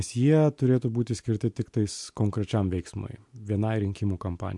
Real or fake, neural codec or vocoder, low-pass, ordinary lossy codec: real; none; 10.8 kHz; MP3, 64 kbps